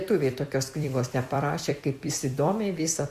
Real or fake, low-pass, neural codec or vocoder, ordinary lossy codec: fake; 14.4 kHz; vocoder, 44.1 kHz, 128 mel bands every 256 samples, BigVGAN v2; Opus, 64 kbps